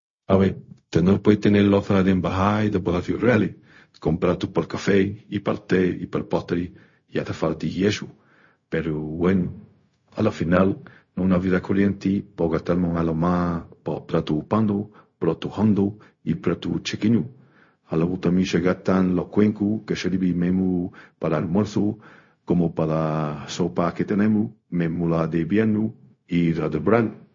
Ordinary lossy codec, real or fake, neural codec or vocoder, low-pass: MP3, 32 kbps; fake; codec, 16 kHz, 0.4 kbps, LongCat-Audio-Codec; 7.2 kHz